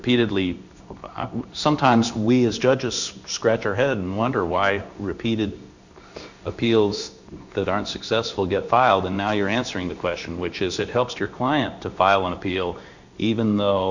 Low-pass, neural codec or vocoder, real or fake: 7.2 kHz; codec, 16 kHz in and 24 kHz out, 1 kbps, XY-Tokenizer; fake